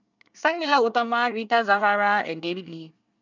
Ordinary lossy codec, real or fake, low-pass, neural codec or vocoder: none; fake; 7.2 kHz; codec, 24 kHz, 1 kbps, SNAC